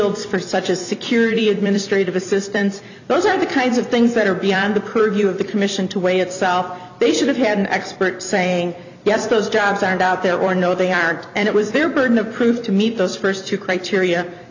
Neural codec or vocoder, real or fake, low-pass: vocoder, 44.1 kHz, 128 mel bands every 256 samples, BigVGAN v2; fake; 7.2 kHz